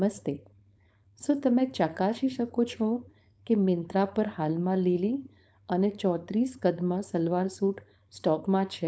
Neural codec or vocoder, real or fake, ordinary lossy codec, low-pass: codec, 16 kHz, 4.8 kbps, FACodec; fake; none; none